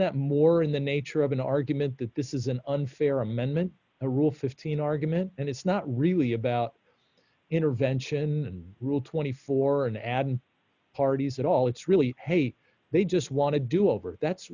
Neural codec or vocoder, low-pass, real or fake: none; 7.2 kHz; real